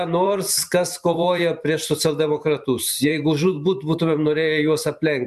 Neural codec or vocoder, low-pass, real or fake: vocoder, 44.1 kHz, 128 mel bands every 512 samples, BigVGAN v2; 14.4 kHz; fake